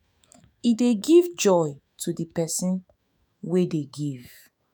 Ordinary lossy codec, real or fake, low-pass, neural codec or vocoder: none; fake; none; autoencoder, 48 kHz, 128 numbers a frame, DAC-VAE, trained on Japanese speech